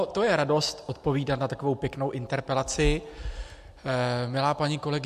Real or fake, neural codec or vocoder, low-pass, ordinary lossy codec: real; none; 14.4 kHz; MP3, 64 kbps